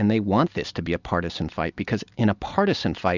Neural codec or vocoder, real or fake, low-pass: none; real; 7.2 kHz